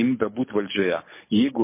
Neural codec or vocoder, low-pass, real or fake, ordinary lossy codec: codec, 24 kHz, 6 kbps, HILCodec; 3.6 kHz; fake; MP3, 24 kbps